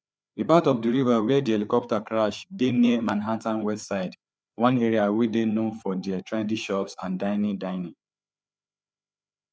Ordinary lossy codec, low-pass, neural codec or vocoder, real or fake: none; none; codec, 16 kHz, 4 kbps, FreqCodec, larger model; fake